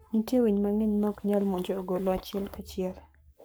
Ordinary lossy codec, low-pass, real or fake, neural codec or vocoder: none; none; fake; codec, 44.1 kHz, 7.8 kbps, Pupu-Codec